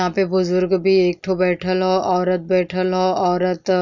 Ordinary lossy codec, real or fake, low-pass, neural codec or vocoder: none; real; 7.2 kHz; none